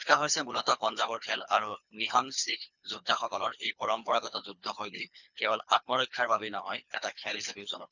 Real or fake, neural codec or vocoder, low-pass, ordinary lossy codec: fake; codec, 24 kHz, 3 kbps, HILCodec; 7.2 kHz; none